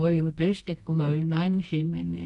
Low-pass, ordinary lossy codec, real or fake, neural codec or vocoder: 10.8 kHz; none; fake; codec, 24 kHz, 0.9 kbps, WavTokenizer, medium music audio release